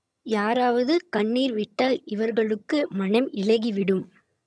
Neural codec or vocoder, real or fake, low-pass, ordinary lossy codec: vocoder, 22.05 kHz, 80 mel bands, HiFi-GAN; fake; none; none